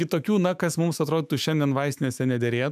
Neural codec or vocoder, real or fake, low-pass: autoencoder, 48 kHz, 128 numbers a frame, DAC-VAE, trained on Japanese speech; fake; 14.4 kHz